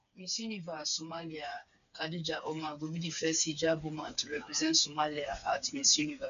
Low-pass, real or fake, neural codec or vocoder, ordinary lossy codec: 7.2 kHz; fake; codec, 16 kHz, 4 kbps, FreqCodec, smaller model; none